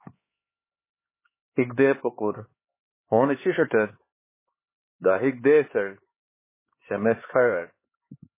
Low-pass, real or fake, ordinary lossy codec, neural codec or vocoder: 3.6 kHz; fake; MP3, 16 kbps; codec, 16 kHz, 4 kbps, X-Codec, HuBERT features, trained on LibriSpeech